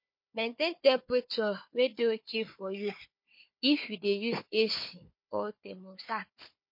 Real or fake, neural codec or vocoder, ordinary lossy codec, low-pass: fake; codec, 16 kHz, 4 kbps, FunCodec, trained on Chinese and English, 50 frames a second; MP3, 32 kbps; 5.4 kHz